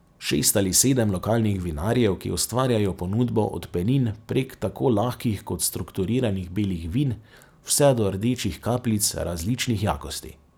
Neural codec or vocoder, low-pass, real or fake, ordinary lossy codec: none; none; real; none